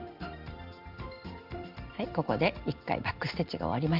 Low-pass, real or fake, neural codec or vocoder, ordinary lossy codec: 5.4 kHz; real; none; Opus, 24 kbps